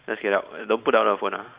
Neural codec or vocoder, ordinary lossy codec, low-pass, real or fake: vocoder, 44.1 kHz, 128 mel bands every 512 samples, BigVGAN v2; Opus, 64 kbps; 3.6 kHz; fake